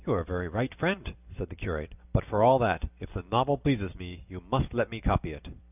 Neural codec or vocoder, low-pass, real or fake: none; 3.6 kHz; real